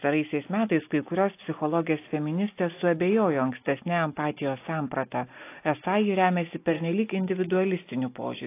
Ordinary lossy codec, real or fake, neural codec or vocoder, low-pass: AAC, 24 kbps; real; none; 3.6 kHz